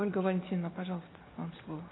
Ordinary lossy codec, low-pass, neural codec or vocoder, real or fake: AAC, 16 kbps; 7.2 kHz; none; real